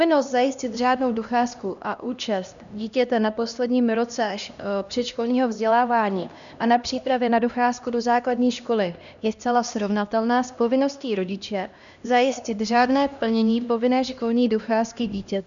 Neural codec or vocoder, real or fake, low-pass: codec, 16 kHz, 1 kbps, X-Codec, HuBERT features, trained on LibriSpeech; fake; 7.2 kHz